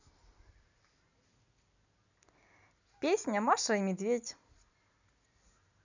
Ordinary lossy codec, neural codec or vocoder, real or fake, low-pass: none; none; real; 7.2 kHz